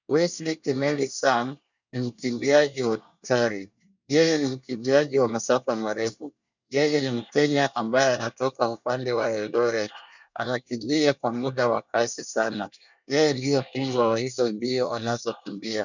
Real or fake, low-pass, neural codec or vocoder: fake; 7.2 kHz; codec, 24 kHz, 1 kbps, SNAC